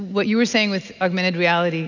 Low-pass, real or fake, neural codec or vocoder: 7.2 kHz; real; none